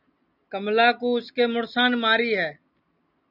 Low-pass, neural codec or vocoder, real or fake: 5.4 kHz; none; real